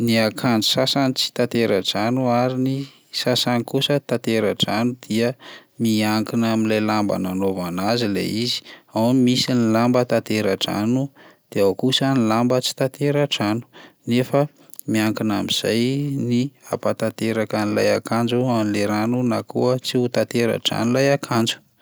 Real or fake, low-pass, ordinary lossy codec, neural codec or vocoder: real; none; none; none